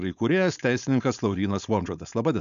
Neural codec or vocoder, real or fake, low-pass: codec, 16 kHz, 4.8 kbps, FACodec; fake; 7.2 kHz